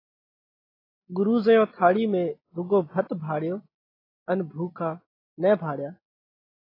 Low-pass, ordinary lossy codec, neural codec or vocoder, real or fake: 5.4 kHz; AAC, 24 kbps; none; real